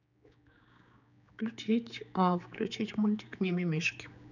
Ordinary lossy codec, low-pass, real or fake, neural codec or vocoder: none; 7.2 kHz; fake; codec, 16 kHz, 4 kbps, X-Codec, HuBERT features, trained on general audio